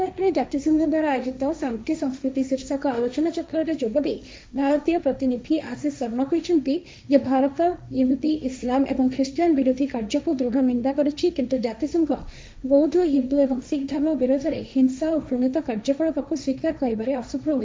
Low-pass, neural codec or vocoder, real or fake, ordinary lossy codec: none; codec, 16 kHz, 1.1 kbps, Voila-Tokenizer; fake; none